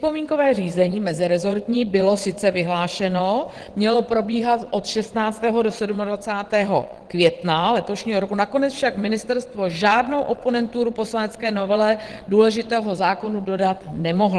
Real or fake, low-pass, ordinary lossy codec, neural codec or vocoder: fake; 9.9 kHz; Opus, 16 kbps; vocoder, 22.05 kHz, 80 mel bands, WaveNeXt